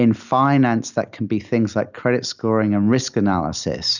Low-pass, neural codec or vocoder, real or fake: 7.2 kHz; none; real